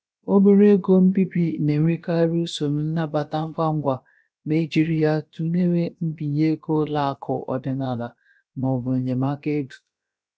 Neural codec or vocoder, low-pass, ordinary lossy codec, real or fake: codec, 16 kHz, about 1 kbps, DyCAST, with the encoder's durations; none; none; fake